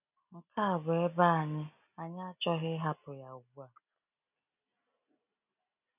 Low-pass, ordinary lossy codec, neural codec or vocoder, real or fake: 3.6 kHz; none; none; real